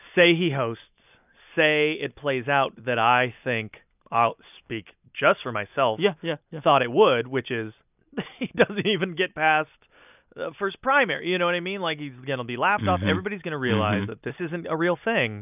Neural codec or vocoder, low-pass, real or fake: none; 3.6 kHz; real